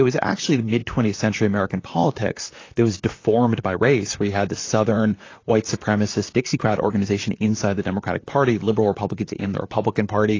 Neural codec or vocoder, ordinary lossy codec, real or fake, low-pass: vocoder, 44.1 kHz, 128 mel bands, Pupu-Vocoder; AAC, 32 kbps; fake; 7.2 kHz